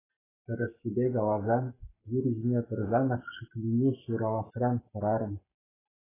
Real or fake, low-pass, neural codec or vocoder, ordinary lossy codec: fake; 3.6 kHz; codec, 44.1 kHz, 7.8 kbps, Pupu-Codec; AAC, 16 kbps